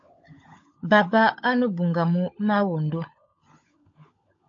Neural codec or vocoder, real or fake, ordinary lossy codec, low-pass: codec, 16 kHz, 16 kbps, FunCodec, trained on LibriTTS, 50 frames a second; fake; AAC, 48 kbps; 7.2 kHz